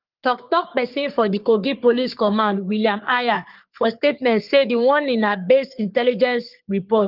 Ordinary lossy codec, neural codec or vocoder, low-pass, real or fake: Opus, 32 kbps; codec, 44.1 kHz, 3.4 kbps, Pupu-Codec; 5.4 kHz; fake